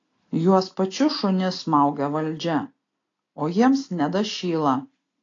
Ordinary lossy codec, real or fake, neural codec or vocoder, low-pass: AAC, 32 kbps; real; none; 7.2 kHz